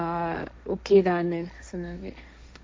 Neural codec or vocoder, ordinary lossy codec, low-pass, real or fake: codec, 16 kHz, 1.1 kbps, Voila-Tokenizer; none; none; fake